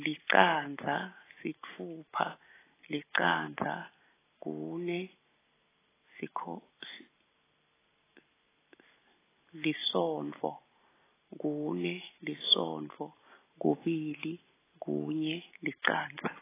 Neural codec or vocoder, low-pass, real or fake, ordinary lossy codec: none; 3.6 kHz; real; AAC, 16 kbps